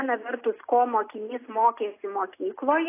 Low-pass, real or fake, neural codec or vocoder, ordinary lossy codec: 3.6 kHz; real; none; AAC, 24 kbps